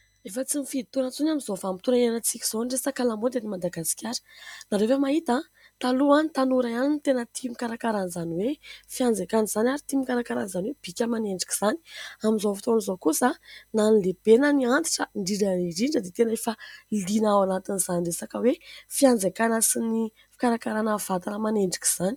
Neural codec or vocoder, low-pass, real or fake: none; 19.8 kHz; real